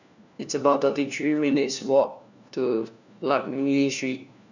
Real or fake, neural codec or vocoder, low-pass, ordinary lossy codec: fake; codec, 16 kHz, 1 kbps, FunCodec, trained on LibriTTS, 50 frames a second; 7.2 kHz; none